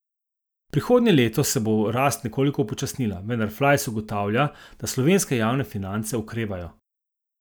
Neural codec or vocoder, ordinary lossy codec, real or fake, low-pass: none; none; real; none